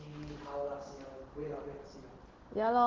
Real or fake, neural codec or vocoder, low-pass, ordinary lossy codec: real; none; 7.2 kHz; Opus, 16 kbps